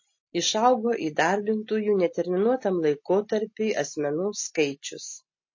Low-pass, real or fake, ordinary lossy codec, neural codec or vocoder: 7.2 kHz; real; MP3, 32 kbps; none